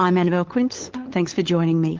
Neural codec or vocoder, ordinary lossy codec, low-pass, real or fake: codec, 16 kHz, 8 kbps, FunCodec, trained on LibriTTS, 25 frames a second; Opus, 16 kbps; 7.2 kHz; fake